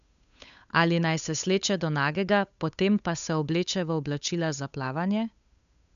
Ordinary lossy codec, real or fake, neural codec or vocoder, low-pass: none; fake; codec, 16 kHz, 8 kbps, FunCodec, trained on Chinese and English, 25 frames a second; 7.2 kHz